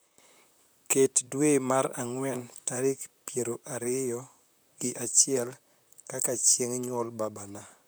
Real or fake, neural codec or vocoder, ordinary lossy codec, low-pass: fake; vocoder, 44.1 kHz, 128 mel bands, Pupu-Vocoder; none; none